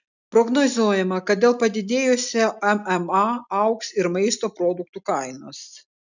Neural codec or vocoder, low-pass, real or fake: none; 7.2 kHz; real